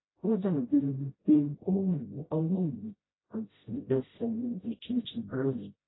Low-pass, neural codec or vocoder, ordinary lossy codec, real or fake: 7.2 kHz; codec, 16 kHz, 0.5 kbps, FreqCodec, smaller model; AAC, 16 kbps; fake